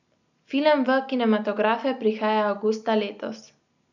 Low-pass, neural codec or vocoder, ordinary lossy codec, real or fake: 7.2 kHz; none; none; real